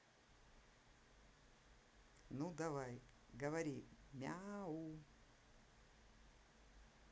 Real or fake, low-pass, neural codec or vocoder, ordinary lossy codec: real; none; none; none